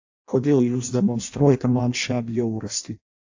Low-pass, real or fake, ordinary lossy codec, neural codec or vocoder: 7.2 kHz; fake; AAC, 48 kbps; codec, 16 kHz in and 24 kHz out, 0.6 kbps, FireRedTTS-2 codec